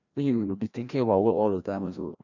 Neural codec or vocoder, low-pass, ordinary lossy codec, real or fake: codec, 16 kHz, 1 kbps, FreqCodec, larger model; 7.2 kHz; none; fake